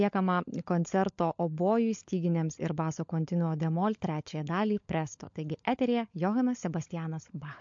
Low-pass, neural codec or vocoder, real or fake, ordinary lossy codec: 7.2 kHz; codec, 16 kHz, 16 kbps, FunCodec, trained on LibriTTS, 50 frames a second; fake; MP3, 48 kbps